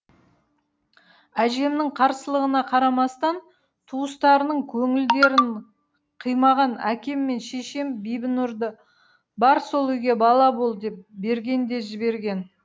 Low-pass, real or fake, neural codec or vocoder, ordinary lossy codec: none; real; none; none